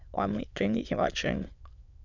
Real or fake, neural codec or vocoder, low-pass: fake; autoencoder, 22.05 kHz, a latent of 192 numbers a frame, VITS, trained on many speakers; 7.2 kHz